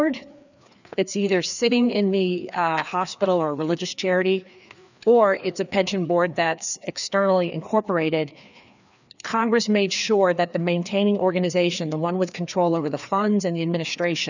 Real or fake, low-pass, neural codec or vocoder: fake; 7.2 kHz; codec, 16 kHz, 2 kbps, FreqCodec, larger model